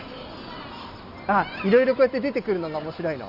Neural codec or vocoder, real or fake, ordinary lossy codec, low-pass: none; real; none; 5.4 kHz